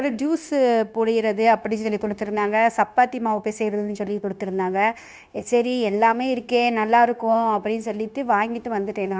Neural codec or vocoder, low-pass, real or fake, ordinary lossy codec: codec, 16 kHz, 0.9 kbps, LongCat-Audio-Codec; none; fake; none